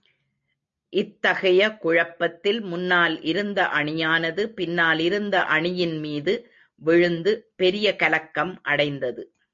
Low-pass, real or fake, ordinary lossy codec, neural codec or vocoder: 7.2 kHz; real; MP3, 64 kbps; none